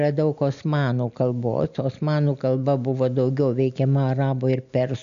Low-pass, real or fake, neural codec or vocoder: 7.2 kHz; real; none